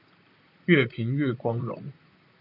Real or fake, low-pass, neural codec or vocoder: fake; 5.4 kHz; vocoder, 44.1 kHz, 128 mel bands, Pupu-Vocoder